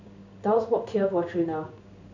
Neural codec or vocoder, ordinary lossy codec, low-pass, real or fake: codec, 16 kHz in and 24 kHz out, 1 kbps, XY-Tokenizer; none; 7.2 kHz; fake